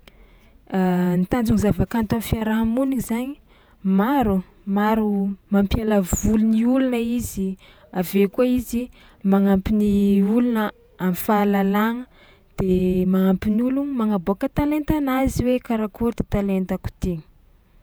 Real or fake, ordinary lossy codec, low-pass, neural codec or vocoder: fake; none; none; vocoder, 48 kHz, 128 mel bands, Vocos